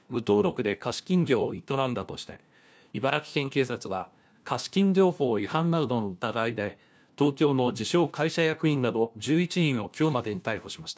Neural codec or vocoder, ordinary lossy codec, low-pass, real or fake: codec, 16 kHz, 1 kbps, FunCodec, trained on LibriTTS, 50 frames a second; none; none; fake